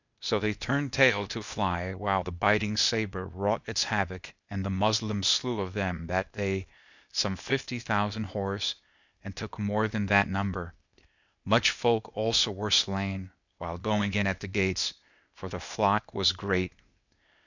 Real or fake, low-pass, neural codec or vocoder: fake; 7.2 kHz; codec, 16 kHz, 0.8 kbps, ZipCodec